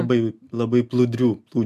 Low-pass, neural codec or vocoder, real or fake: 14.4 kHz; none; real